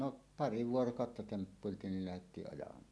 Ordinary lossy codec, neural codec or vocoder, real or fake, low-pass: none; none; real; none